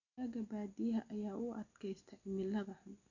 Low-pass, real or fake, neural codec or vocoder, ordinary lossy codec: 7.2 kHz; real; none; none